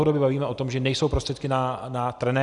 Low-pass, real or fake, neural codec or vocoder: 10.8 kHz; real; none